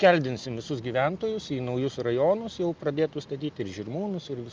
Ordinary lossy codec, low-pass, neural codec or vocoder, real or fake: Opus, 24 kbps; 7.2 kHz; none; real